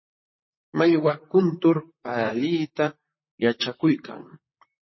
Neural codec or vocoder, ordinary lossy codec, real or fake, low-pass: vocoder, 44.1 kHz, 128 mel bands, Pupu-Vocoder; MP3, 24 kbps; fake; 7.2 kHz